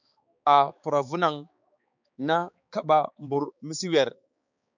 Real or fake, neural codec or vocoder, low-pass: fake; codec, 16 kHz, 4 kbps, X-Codec, HuBERT features, trained on balanced general audio; 7.2 kHz